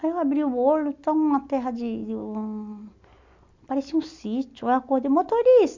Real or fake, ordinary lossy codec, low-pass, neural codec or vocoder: real; MP3, 64 kbps; 7.2 kHz; none